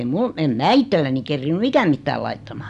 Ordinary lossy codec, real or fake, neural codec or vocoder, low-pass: none; real; none; 10.8 kHz